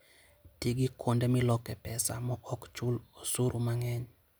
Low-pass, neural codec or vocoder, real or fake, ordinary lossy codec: none; none; real; none